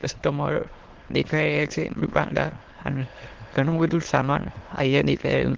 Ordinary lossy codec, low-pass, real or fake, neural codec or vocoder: Opus, 16 kbps; 7.2 kHz; fake; autoencoder, 22.05 kHz, a latent of 192 numbers a frame, VITS, trained on many speakers